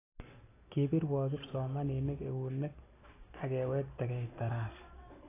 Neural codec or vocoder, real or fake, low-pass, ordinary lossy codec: none; real; 3.6 kHz; AAC, 16 kbps